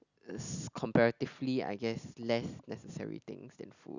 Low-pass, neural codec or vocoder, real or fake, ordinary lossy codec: 7.2 kHz; none; real; none